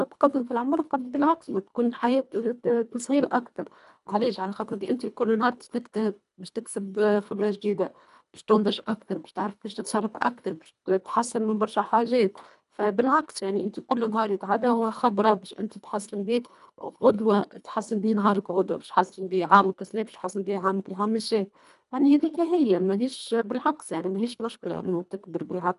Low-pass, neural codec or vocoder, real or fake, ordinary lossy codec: 10.8 kHz; codec, 24 kHz, 1.5 kbps, HILCodec; fake; none